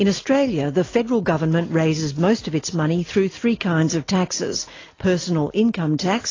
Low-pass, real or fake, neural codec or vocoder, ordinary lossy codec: 7.2 kHz; real; none; AAC, 32 kbps